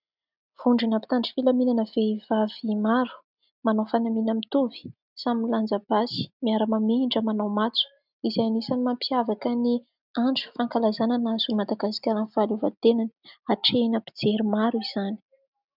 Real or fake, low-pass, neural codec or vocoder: real; 5.4 kHz; none